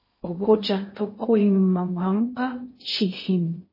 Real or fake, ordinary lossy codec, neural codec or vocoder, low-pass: fake; MP3, 24 kbps; codec, 16 kHz in and 24 kHz out, 0.6 kbps, FocalCodec, streaming, 2048 codes; 5.4 kHz